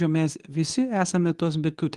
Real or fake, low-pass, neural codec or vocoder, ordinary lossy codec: fake; 10.8 kHz; codec, 24 kHz, 0.9 kbps, WavTokenizer, medium speech release version 2; Opus, 24 kbps